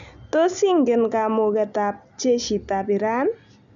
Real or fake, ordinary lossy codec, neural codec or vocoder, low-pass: real; none; none; 7.2 kHz